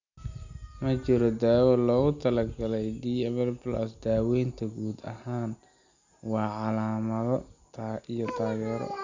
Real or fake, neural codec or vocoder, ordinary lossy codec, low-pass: real; none; none; 7.2 kHz